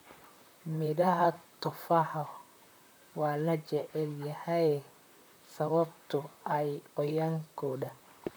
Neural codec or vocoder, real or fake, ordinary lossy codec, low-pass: vocoder, 44.1 kHz, 128 mel bands, Pupu-Vocoder; fake; none; none